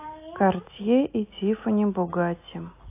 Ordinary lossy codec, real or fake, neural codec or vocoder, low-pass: AAC, 24 kbps; real; none; 3.6 kHz